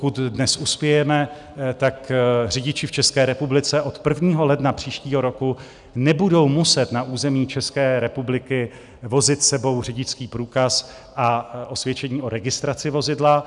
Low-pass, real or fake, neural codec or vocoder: 10.8 kHz; real; none